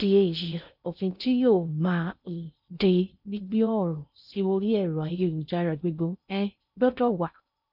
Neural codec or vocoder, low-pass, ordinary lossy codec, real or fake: codec, 16 kHz in and 24 kHz out, 0.6 kbps, FocalCodec, streaming, 2048 codes; 5.4 kHz; none; fake